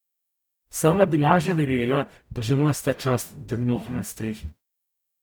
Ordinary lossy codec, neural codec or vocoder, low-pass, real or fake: none; codec, 44.1 kHz, 0.9 kbps, DAC; none; fake